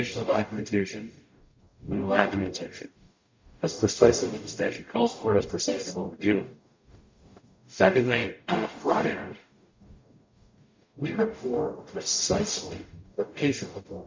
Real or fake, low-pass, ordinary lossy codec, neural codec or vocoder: fake; 7.2 kHz; MP3, 48 kbps; codec, 44.1 kHz, 0.9 kbps, DAC